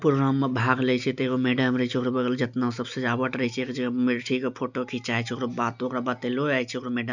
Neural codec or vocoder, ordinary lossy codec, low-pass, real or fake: none; none; 7.2 kHz; real